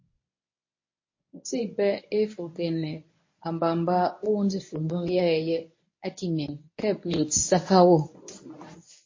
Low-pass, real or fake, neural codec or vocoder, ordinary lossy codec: 7.2 kHz; fake; codec, 24 kHz, 0.9 kbps, WavTokenizer, medium speech release version 1; MP3, 32 kbps